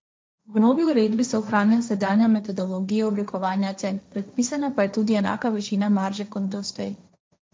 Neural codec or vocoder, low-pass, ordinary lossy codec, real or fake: codec, 16 kHz, 1.1 kbps, Voila-Tokenizer; none; none; fake